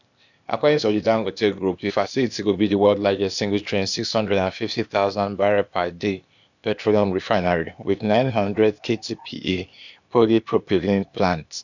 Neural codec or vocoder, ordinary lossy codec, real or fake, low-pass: codec, 16 kHz, 0.8 kbps, ZipCodec; none; fake; 7.2 kHz